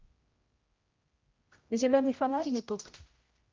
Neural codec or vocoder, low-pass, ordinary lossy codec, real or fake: codec, 16 kHz, 0.5 kbps, X-Codec, HuBERT features, trained on general audio; 7.2 kHz; Opus, 32 kbps; fake